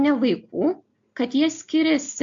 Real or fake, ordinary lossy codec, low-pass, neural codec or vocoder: real; AAC, 64 kbps; 7.2 kHz; none